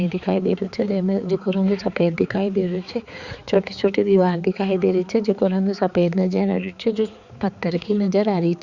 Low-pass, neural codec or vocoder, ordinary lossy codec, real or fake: 7.2 kHz; codec, 16 kHz, 4 kbps, X-Codec, HuBERT features, trained on balanced general audio; Opus, 64 kbps; fake